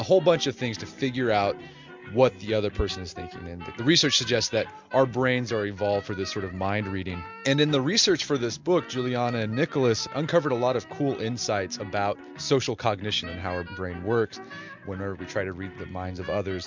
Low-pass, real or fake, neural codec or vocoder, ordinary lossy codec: 7.2 kHz; real; none; MP3, 64 kbps